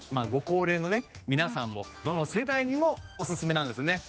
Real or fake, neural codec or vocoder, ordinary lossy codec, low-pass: fake; codec, 16 kHz, 2 kbps, X-Codec, HuBERT features, trained on general audio; none; none